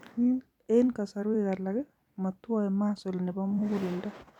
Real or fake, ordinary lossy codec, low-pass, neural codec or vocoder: fake; none; 19.8 kHz; vocoder, 44.1 kHz, 128 mel bands every 256 samples, BigVGAN v2